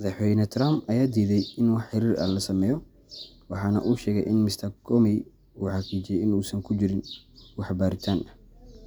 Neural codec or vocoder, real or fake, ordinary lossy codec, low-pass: none; real; none; none